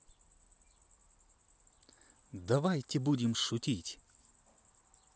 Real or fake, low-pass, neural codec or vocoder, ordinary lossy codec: real; none; none; none